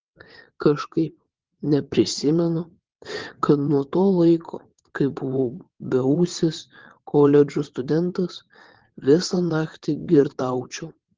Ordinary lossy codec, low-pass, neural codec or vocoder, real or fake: Opus, 16 kbps; 7.2 kHz; vocoder, 22.05 kHz, 80 mel bands, WaveNeXt; fake